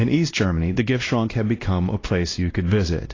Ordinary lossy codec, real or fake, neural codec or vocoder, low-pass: AAC, 32 kbps; fake; codec, 16 kHz, 1 kbps, X-Codec, WavLM features, trained on Multilingual LibriSpeech; 7.2 kHz